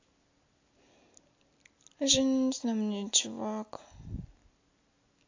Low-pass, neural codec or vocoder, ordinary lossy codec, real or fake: 7.2 kHz; none; AAC, 48 kbps; real